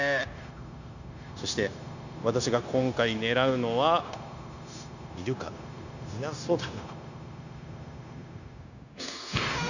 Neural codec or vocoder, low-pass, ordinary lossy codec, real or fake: codec, 16 kHz, 0.9 kbps, LongCat-Audio-Codec; 7.2 kHz; none; fake